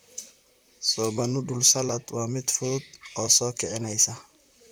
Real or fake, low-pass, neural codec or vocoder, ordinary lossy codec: fake; none; vocoder, 44.1 kHz, 128 mel bands, Pupu-Vocoder; none